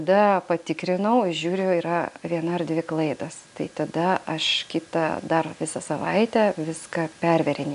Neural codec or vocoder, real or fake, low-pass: none; real; 10.8 kHz